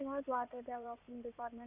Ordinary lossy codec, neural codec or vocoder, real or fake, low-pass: none; codec, 16 kHz in and 24 kHz out, 2.2 kbps, FireRedTTS-2 codec; fake; 3.6 kHz